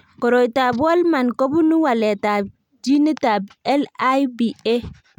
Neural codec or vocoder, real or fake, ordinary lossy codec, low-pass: none; real; none; 19.8 kHz